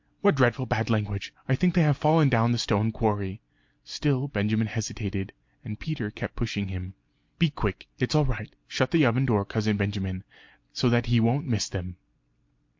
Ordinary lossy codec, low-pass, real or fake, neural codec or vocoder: MP3, 48 kbps; 7.2 kHz; real; none